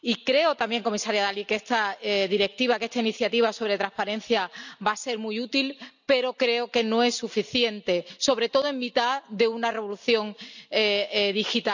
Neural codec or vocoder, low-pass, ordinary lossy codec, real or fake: none; 7.2 kHz; none; real